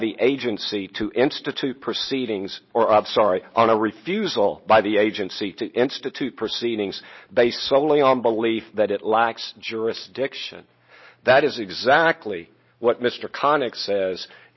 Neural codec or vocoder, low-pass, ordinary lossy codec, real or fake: none; 7.2 kHz; MP3, 24 kbps; real